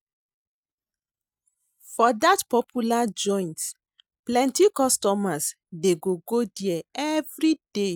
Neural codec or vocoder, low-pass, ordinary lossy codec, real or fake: none; none; none; real